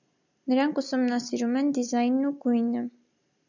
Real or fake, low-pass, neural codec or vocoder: real; 7.2 kHz; none